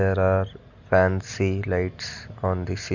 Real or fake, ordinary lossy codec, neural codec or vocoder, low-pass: real; none; none; 7.2 kHz